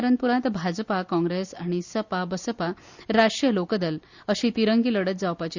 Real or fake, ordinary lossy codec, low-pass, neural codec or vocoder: real; Opus, 64 kbps; 7.2 kHz; none